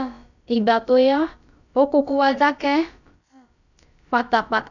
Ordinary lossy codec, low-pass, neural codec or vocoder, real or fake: none; 7.2 kHz; codec, 16 kHz, about 1 kbps, DyCAST, with the encoder's durations; fake